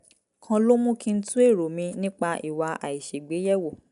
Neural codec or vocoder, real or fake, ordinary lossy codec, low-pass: none; real; none; 10.8 kHz